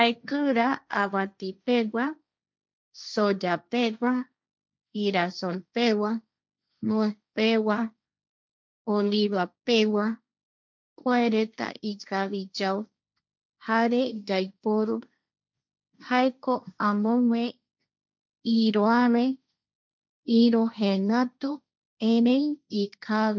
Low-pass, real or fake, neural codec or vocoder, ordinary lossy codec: none; fake; codec, 16 kHz, 1.1 kbps, Voila-Tokenizer; none